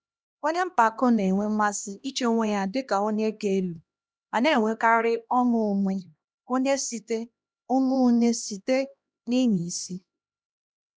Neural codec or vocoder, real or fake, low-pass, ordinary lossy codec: codec, 16 kHz, 1 kbps, X-Codec, HuBERT features, trained on LibriSpeech; fake; none; none